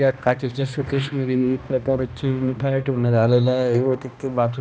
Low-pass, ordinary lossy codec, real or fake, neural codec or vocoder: none; none; fake; codec, 16 kHz, 1 kbps, X-Codec, HuBERT features, trained on balanced general audio